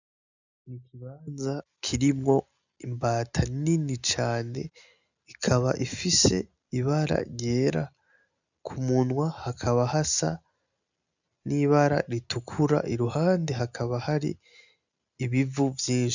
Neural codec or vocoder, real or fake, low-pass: none; real; 7.2 kHz